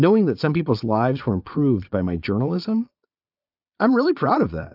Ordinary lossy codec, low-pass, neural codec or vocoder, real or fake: AAC, 48 kbps; 5.4 kHz; vocoder, 22.05 kHz, 80 mel bands, Vocos; fake